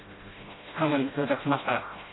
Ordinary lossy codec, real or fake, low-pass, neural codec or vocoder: AAC, 16 kbps; fake; 7.2 kHz; codec, 16 kHz, 0.5 kbps, FreqCodec, smaller model